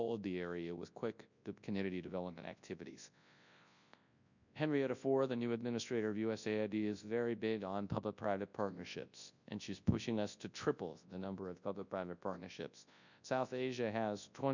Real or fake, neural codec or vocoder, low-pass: fake; codec, 24 kHz, 0.9 kbps, WavTokenizer, large speech release; 7.2 kHz